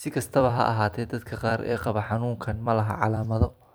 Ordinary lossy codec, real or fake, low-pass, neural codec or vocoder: none; fake; none; vocoder, 44.1 kHz, 128 mel bands every 256 samples, BigVGAN v2